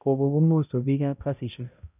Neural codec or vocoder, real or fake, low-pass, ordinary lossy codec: codec, 16 kHz, 0.5 kbps, X-Codec, HuBERT features, trained on balanced general audio; fake; 3.6 kHz; none